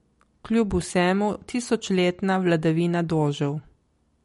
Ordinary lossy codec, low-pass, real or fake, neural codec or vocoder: MP3, 48 kbps; 19.8 kHz; real; none